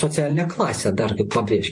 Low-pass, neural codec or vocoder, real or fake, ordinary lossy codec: 10.8 kHz; vocoder, 44.1 kHz, 128 mel bands every 512 samples, BigVGAN v2; fake; MP3, 48 kbps